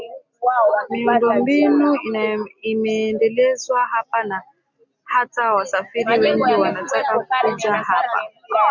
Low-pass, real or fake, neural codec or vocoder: 7.2 kHz; real; none